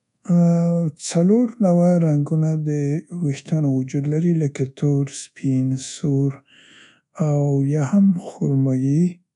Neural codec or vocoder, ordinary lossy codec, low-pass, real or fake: codec, 24 kHz, 1.2 kbps, DualCodec; none; 10.8 kHz; fake